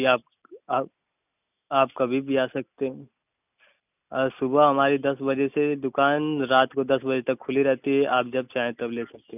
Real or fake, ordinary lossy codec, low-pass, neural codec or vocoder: real; none; 3.6 kHz; none